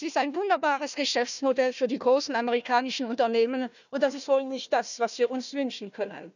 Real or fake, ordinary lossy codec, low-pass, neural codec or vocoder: fake; none; 7.2 kHz; codec, 16 kHz, 1 kbps, FunCodec, trained on Chinese and English, 50 frames a second